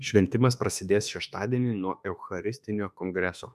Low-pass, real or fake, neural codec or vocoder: 14.4 kHz; fake; autoencoder, 48 kHz, 32 numbers a frame, DAC-VAE, trained on Japanese speech